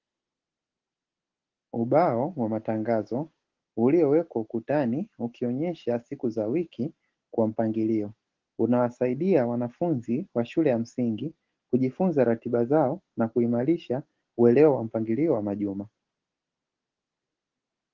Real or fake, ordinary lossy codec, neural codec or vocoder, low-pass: real; Opus, 16 kbps; none; 7.2 kHz